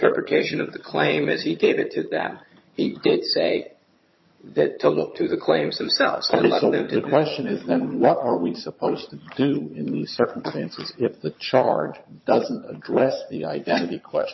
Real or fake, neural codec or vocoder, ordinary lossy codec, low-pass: fake; vocoder, 22.05 kHz, 80 mel bands, HiFi-GAN; MP3, 24 kbps; 7.2 kHz